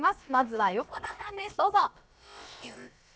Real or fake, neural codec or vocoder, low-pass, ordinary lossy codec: fake; codec, 16 kHz, about 1 kbps, DyCAST, with the encoder's durations; none; none